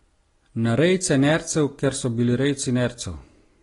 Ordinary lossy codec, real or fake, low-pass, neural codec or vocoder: AAC, 32 kbps; real; 10.8 kHz; none